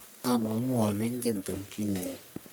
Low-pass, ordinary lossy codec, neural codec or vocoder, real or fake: none; none; codec, 44.1 kHz, 1.7 kbps, Pupu-Codec; fake